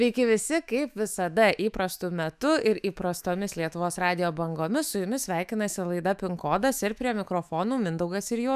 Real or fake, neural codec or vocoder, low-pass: fake; autoencoder, 48 kHz, 128 numbers a frame, DAC-VAE, trained on Japanese speech; 14.4 kHz